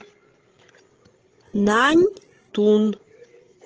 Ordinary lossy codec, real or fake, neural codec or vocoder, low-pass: Opus, 16 kbps; fake; codec, 16 kHz, 16 kbps, FreqCodec, larger model; 7.2 kHz